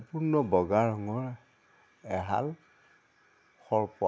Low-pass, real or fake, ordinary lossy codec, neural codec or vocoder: none; real; none; none